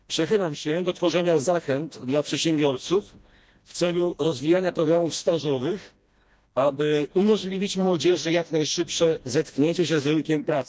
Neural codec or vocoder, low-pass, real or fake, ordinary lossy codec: codec, 16 kHz, 1 kbps, FreqCodec, smaller model; none; fake; none